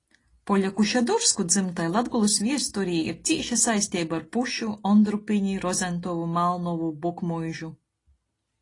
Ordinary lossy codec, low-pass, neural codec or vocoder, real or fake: AAC, 32 kbps; 10.8 kHz; none; real